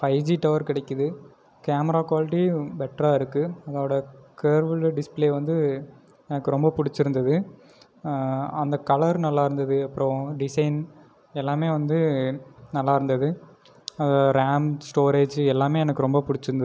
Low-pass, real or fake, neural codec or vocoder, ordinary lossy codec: none; real; none; none